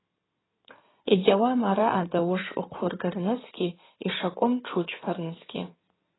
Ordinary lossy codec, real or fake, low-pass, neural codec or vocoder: AAC, 16 kbps; fake; 7.2 kHz; codec, 16 kHz in and 24 kHz out, 2.2 kbps, FireRedTTS-2 codec